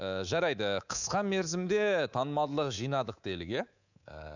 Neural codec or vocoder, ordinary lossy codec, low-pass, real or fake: codec, 24 kHz, 3.1 kbps, DualCodec; none; 7.2 kHz; fake